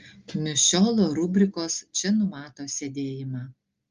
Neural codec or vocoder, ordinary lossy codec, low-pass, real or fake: none; Opus, 32 kbps; 7.2 kHz; real